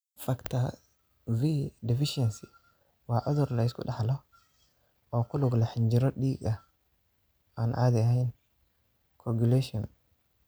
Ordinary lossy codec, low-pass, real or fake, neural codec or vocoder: none; none; real; none